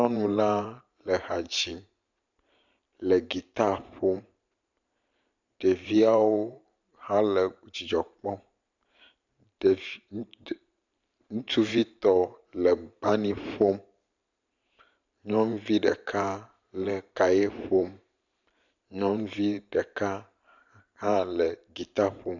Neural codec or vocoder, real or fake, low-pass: vocoder, 44.1 kHz, 128 mel bands every 512 samples, BigVGAN v2; fake; 7.2 kHz